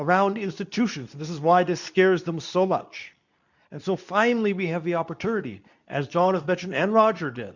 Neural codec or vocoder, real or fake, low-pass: codec, 24 kHz, 0.9 kbps, WavTokenizer, medium speech release version 2; fake; 7.2 kHz